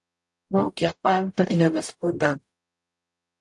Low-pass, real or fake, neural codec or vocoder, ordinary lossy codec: 10.8 kHz; fake; codec, 44.1 kHz, 0.9 kbps, DAC; AAC, 64 kbps